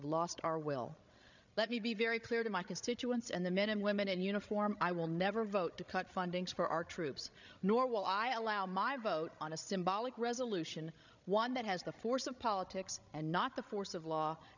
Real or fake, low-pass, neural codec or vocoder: fake; 7.2 kHz; codec, 16 kHz, 16 kbps, FreqCodec, larger model